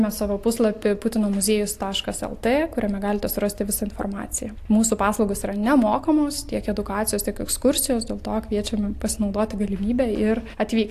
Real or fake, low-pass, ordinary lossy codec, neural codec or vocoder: real; 14.4 kHz; AAC, 64 kbps; none